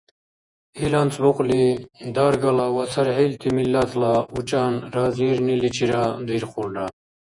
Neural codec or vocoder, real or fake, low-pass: vocoder, 48 kHz, 128 mel bands, Vocos; fake; 10.8 kHz